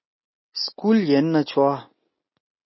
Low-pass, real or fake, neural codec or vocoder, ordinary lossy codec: 7.2 kHz; real; none; MP3, 24 kbps